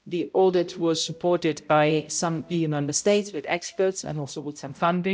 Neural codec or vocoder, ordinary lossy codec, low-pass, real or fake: codec, 16 kHz, 0.5 kbps, X-Codec, HuBERT features, trained on balanced general audio; none; none; fake